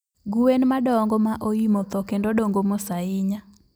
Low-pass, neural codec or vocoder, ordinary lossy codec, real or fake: none; none; none; real